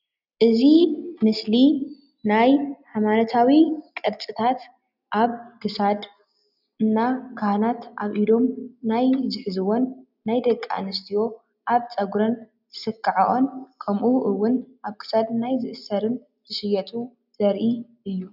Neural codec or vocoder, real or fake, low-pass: none; real; 5.4 kHz